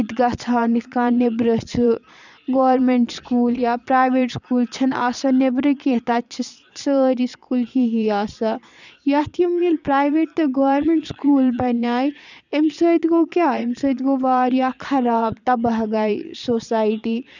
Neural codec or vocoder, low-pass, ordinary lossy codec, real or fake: vocoder, 22.05 kHz, 80 mel bands, Vocos; 7.2 kHz; none; fake